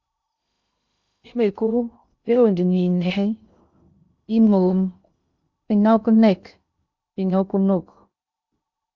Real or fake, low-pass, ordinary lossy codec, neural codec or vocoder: fake; 7.2 kHz; Opus, 64 kbps; codec, 16 kHz in and 24 kHz out, 0.6 kbps, FocalCodec, streaming, 2048 codes